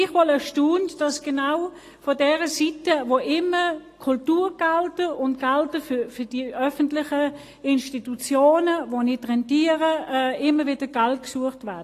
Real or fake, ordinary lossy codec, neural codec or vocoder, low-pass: real; AAC, 48 kbps; none; 14.4 kHz